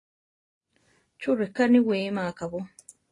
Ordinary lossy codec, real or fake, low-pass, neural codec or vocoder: AAC, 32 kbps; real; 10.8 kHz; none